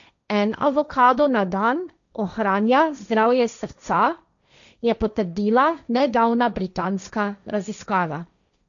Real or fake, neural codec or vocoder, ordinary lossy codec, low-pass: fake; codec, 16 kHz, 1.1 kbps, Voila-Tokenizer; none; 7.2 kHz